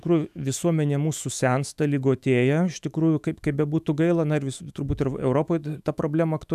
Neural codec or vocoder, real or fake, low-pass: autoencoder, 48 kHz, 128 numbers a frame, DAC-VAE, trained on Japanese speech; fake; 14.4 kHz